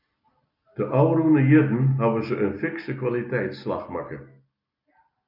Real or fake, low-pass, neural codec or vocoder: real; 5.4 kHz; none